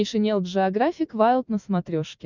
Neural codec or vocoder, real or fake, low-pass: none; real; 7.2 kHz